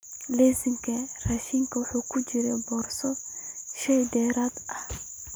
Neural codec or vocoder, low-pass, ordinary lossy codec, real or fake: none; none; none; real